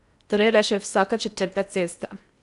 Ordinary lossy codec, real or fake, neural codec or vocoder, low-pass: none; fake; codec, 16 kHz in and 24 kHz out, 0.6 kbps, FocalCodec, streaming, 4096 codes; 10.8 kHz